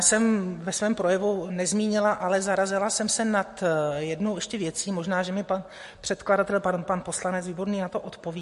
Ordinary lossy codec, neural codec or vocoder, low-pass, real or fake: MP3, 48 kbps; none; 14.4 kHz; real